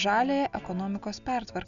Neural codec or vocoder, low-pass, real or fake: none; 7.2 kHz; real